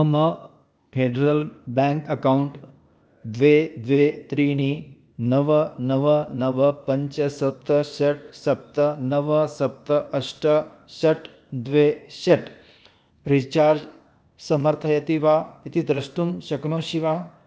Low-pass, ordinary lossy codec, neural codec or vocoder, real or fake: none; none; codec, 16 kHz, 0.8 kbps, ZipCodec; fake